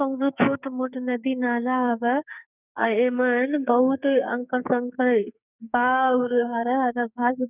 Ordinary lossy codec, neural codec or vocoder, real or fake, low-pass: none; codec, 44.1 kHz, 2.6 kbps, SNAC; fake; 3.6 kHz